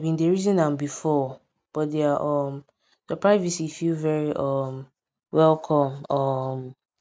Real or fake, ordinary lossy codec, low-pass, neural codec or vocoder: real; none; none; none